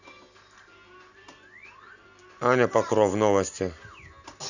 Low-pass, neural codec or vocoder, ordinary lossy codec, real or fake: 7.2 kHz; none; none; real